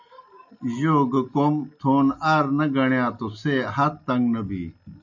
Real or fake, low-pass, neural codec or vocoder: real; 7.2 kHz; none